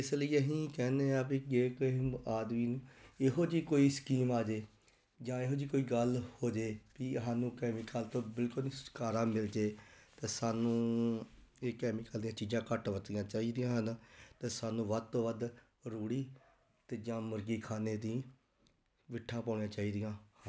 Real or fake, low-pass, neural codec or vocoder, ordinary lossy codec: real; none; none; none